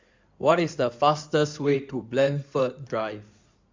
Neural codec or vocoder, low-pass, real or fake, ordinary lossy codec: codec, 16 kHz in and 24 kHz out, 2.2 kbps, FireRedTTS-2 codec; 7.2 kHz; fake; MP3, 64 kbps